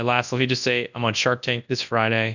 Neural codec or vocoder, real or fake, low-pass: codec, 24 kHz, 0.9 kbps, WavTokenizer, large speech release; fake; 7.2 kHz